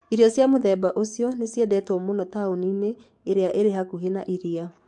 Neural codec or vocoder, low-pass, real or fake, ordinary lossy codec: codec, 44.1 kHz, 7.8 kbps, DAC; 10.8 kHz; fake; MP3, 64 kbps